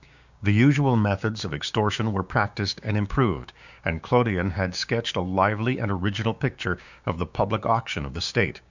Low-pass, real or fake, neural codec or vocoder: 7.2 kHz; fake; codec, 16 kHz, 6 kbps, DAC